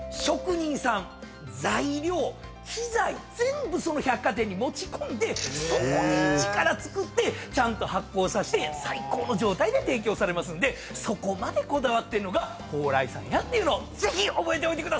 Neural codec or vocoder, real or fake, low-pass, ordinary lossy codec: none; real; none; none